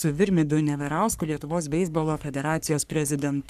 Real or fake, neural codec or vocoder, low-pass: fake; codec, 44.1 kHz, 3.4 kbps, Pupu-Codec; 14.4 kHz